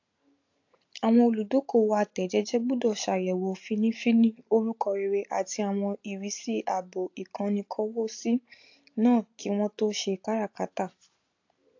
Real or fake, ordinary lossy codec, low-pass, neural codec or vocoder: real; AAC, 48 kbps; 7.2 kHz; none